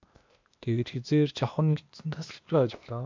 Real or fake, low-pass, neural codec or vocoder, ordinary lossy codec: fake; 7.2 kHz; codec, 16 kHz, 0.8 kbps, ZipCodec; MP3, 64 kbps